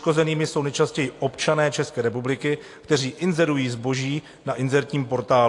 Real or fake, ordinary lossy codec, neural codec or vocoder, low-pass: real; AAC, 48 kbps; none; 10.8 kHz